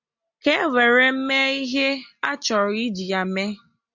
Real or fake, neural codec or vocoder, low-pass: real; none; 7.2 kHz